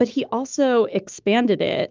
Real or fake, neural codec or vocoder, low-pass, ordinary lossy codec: fake; autoencoder, 48 kHz, 128 numbers a frame, DAC-VAE, trained on Japanese speech; 7.2 kHz; Opus, 32 kbps